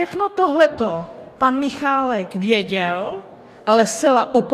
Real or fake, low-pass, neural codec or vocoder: fake; 14.4 kHz; codec, 44.1 kHz, 2.6 kbps, DAC